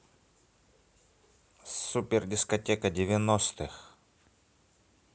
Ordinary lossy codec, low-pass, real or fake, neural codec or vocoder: none; none; real; none